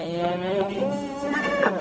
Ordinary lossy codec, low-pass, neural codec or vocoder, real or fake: none; none; codec, 16 kHz, 0.4 kbps, LongCat-Audio-Codec; fake